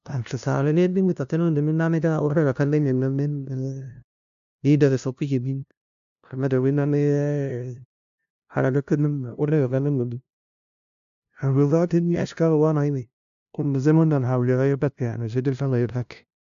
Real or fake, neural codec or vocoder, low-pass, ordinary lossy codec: fake; codec, 16 kHz, 0.5 kbps, FunCodec, trained on LibriTTS, 25 frames a second; 7.2 kHz; AAC, 96 kbps